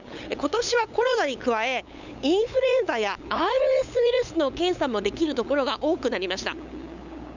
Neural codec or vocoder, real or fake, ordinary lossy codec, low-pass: codec, 16 kHz, 8 kbps, FunCodec, trained on LibriTTS, 25 frames a second; fake; none; 7.2 kHz